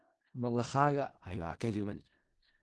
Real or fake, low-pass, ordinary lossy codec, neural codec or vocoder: fake; 10.8 kHz; Opus, 16 kbps; codec, 16 kHz in and 24 kHz out, 0.4 kbps, LongCat-Audio-Codec, four codebook decoder